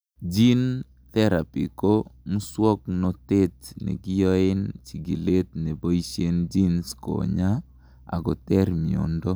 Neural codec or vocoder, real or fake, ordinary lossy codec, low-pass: none; real; none; none